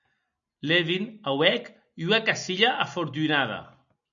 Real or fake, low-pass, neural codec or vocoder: real; 7.2 kHz; none